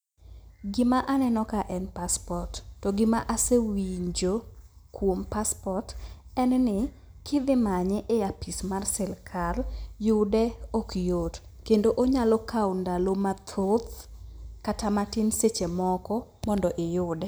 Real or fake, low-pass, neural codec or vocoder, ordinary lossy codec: real; none; none; none